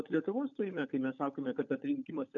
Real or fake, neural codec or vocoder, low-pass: fake; codec, 16 kHz, 16 kbps, FunCodec, trained on LibriTTS, 50 frames a second; 7.2 kHz